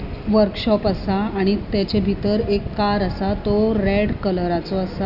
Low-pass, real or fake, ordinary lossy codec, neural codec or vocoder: 5.4 kHz; real; none; none